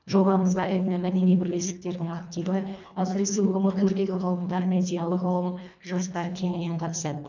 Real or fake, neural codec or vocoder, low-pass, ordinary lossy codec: fake; codec, 24 kHz, 1.5 kbps, HILCodec; 7.2 kHz; none